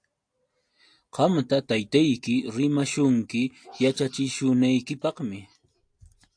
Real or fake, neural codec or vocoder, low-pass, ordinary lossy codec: real; none; 9.9 kHz; AAC, 64 kbps